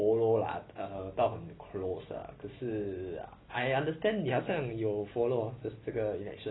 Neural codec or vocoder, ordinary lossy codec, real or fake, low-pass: none; AAC, 16 kbps; real; 7.2 kHz